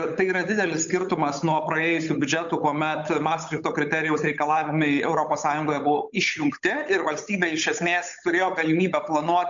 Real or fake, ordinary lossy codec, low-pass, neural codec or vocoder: fake; MP3, 64 kbps; 7.2 kHz; codec, 16 kHz, 8 kbps, FunCodec, trained on Chinese and English, 25 frames a second